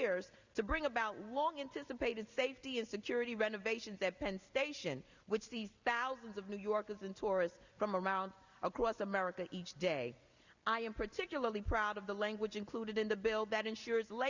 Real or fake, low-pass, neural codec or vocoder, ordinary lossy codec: real; 7.2 kHz; none; Opus, 64 kbps